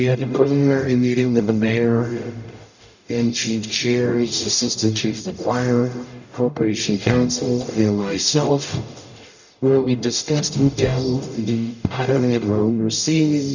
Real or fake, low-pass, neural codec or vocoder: fake; 7.2 kHz; codec, 44.1 kHz, 0.9 kbps, DAC